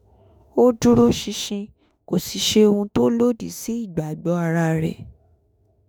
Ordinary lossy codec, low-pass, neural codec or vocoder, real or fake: none; none; autoencoder, 48 kHz, 32 numbers a frame, DAC-VAE, trained on Japanese speech; fake